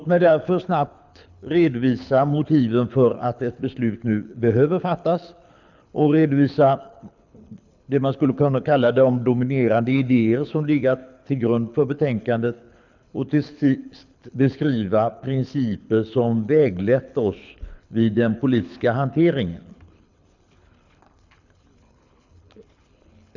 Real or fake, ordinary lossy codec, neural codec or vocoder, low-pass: fake; none; codec, 24 kHz, 6 kbps, HILCodec; 7.2 kHz